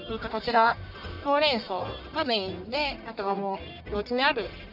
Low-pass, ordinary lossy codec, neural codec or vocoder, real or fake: 5.4 kHz; none; codec, 44.1 kHz, 1.7 kbps, Pupu-Codec; fake